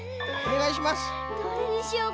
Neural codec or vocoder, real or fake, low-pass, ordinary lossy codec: none; real; none; none